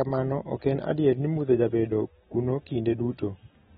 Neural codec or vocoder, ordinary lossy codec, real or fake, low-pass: none; AAC, 16 kbps; real; 19.8 kHz